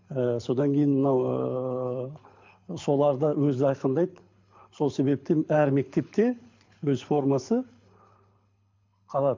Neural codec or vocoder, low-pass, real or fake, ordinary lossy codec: codec, 24 kHz, 6 kbps, HILCodec; 7.2 kHz; fake; MP3, 48 kbps